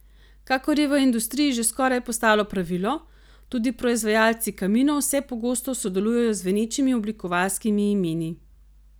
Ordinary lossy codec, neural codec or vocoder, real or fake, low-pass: none; none; real; none